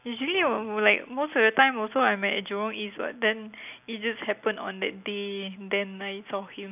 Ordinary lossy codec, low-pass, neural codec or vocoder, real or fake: none; 3.6 kHz; none; real